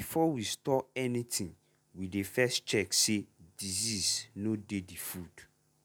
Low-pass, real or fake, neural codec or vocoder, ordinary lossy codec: none; real; none; none